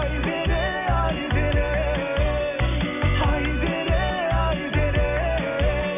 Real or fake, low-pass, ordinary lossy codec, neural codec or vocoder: real; 3.6 kHz; none; none